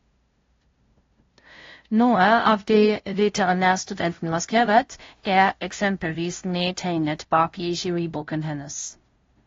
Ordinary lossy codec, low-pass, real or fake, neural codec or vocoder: AAC, 32 kbps; 7.2 kHz; fake; codec, 16 kHz, 0.5 kbps, FunCodec, trained on LibriTTS, 25 frames a second